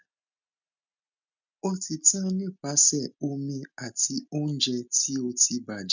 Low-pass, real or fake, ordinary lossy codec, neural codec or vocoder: 7.2 kHz; real; none; none